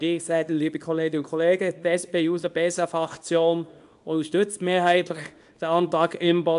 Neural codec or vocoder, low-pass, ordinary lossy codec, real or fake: codec, 24 kHz, 0.9 kbps, WavTokenizer, small release; 10.8 kHz; AAC, 96 kbps; fake